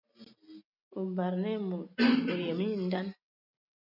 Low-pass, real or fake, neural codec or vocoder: 5.4 kHz; real; none